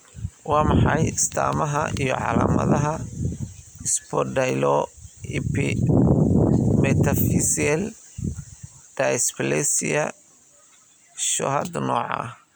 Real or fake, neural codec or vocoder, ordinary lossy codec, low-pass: real; none; none; none